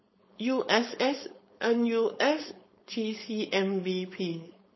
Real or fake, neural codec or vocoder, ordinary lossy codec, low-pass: fake; codec, 16 kHz, 4.8 kbps, FACodec; MP3, 24 kbps; 7.2 kHz